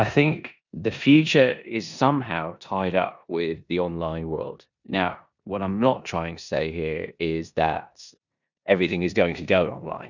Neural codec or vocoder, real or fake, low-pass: codec, 16 kHz in and 24 kHz out, 0.9 kbps, LongCat-Audio-Codec, four codebook decoder; fake; 7.2 kHz